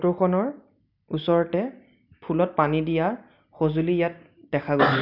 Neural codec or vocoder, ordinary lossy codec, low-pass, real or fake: none; none; 5.4 kHz; real